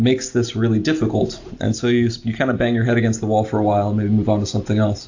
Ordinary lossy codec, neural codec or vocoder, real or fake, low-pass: AAC, 48 kbps; none; real; 7.2 kHz